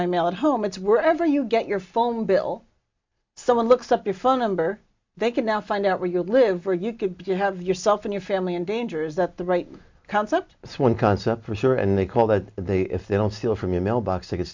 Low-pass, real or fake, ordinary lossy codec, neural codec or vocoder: 7.2 kHz; real; MP3, 64 kbps; none